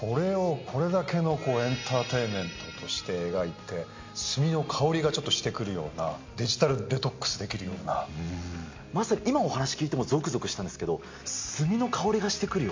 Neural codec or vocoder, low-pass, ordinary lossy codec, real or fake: none; 7.2 kHz; MP3, 48 kbps; real